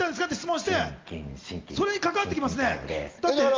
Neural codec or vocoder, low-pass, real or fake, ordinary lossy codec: none; 7.2 kHz; real; Opus, 32 kbps